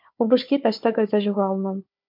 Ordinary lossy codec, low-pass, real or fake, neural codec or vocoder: MP3, 48 kbps; 5.4 kHz; fake; codec, 16 kHz, 4 kbps, FunCodec, trained on Chinese and English, 50 frames a second